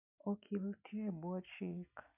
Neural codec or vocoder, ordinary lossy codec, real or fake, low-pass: none; none; real; 3.6 kHz